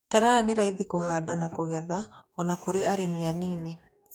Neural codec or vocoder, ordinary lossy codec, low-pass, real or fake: codec, 44.1 kHz, 2.6 kbps, DAC; none; none; fake